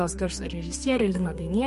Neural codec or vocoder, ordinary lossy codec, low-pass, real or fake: codec, 32 kHz, 1.9 kbps, SNAC; MP3, 48 kbps; 14.4 kHz; fake